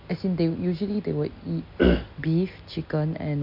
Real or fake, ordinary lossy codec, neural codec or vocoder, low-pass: real; none; none; 5.4 kHz